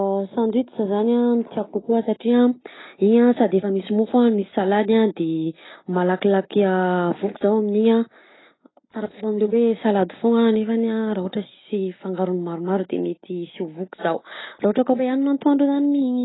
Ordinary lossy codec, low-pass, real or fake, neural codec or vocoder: AAC, 16 kbps; 7.2 kHz; real; none